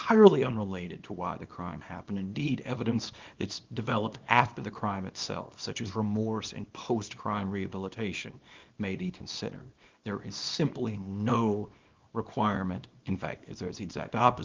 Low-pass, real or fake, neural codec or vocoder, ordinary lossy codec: 7.2 kHz; fake; codec, 24 kHz, 0.9 kbps, WavTokenizer, small release; Opus, 24 kbps